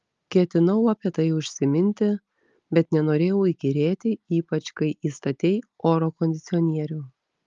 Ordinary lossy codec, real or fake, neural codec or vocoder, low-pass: Opus, 32 kbps; real; none; 7.2 kHz